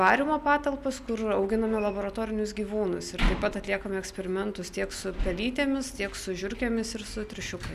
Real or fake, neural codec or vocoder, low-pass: real; none; 14.4 kHz